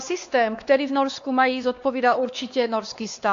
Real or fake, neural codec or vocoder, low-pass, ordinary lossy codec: fake; codec, 16 kHz, 2 kbps, X-Codec, WavLM features, trained on Multilingual LibriSpeech; 7.2 kHz; AAC, 48 kbps